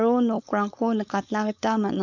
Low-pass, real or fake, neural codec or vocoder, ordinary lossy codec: 7.2 kHz; fake; codec, 16 kHz, 4.8 kbps, FACodec; none